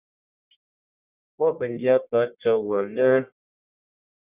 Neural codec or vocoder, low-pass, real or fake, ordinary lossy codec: codec, 44.1 kHz, 1.7 kbps, Pupu-Codec; 3.6 kHz; fake; Opus, 64 kbps